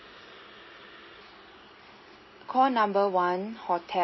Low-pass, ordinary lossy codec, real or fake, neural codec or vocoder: 7.2 kHz; MP3, 24 kbps; real; none